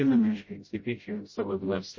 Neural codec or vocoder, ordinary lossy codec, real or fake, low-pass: codec, 16 kHz, 0.5 kbps, FreqCodec, smaller model; MP3, 32 kbps; fake; 7.2 kHz